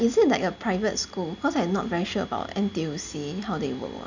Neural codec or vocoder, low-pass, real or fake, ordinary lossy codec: none; 7.2 kHz; real; none